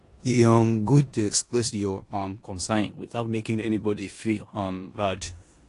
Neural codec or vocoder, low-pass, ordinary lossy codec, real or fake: codec, 16 kHz in and 24 kHz out, 0.9 kbps, LongCat-Audio-Codec, four codebook decoder; 10.8 kHz; AAC, 48 kbps; fake